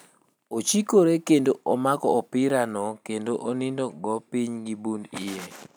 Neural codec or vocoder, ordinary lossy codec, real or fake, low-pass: none; none; real; none